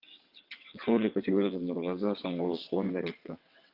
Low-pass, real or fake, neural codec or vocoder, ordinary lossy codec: 5.4 kHz; fake; vocoder, 22.05 kHz, 80 mel bands, WaveNeXt; Opus, 24 kbps